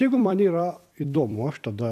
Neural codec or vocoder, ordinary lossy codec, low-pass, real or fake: vocoder, 44.1 kHz, 128 mel bands every 256 samples, BigVGAN v2; AAC, 96 kbps; 14.4 kHz; fake